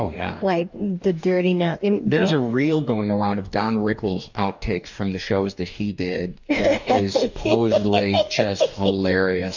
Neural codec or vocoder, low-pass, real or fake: codec, 44.1 kHz, 2.6 kbps, DAC; 7.2 kHz; fake